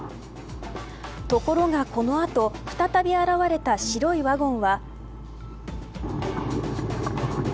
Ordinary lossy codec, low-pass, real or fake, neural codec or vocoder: none; none; real; none